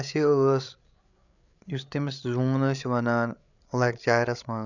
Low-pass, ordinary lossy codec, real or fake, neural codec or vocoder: 7.2 kHz; none; fake; codec, 16 kHz, 8 kbps, FreqCodec, larger model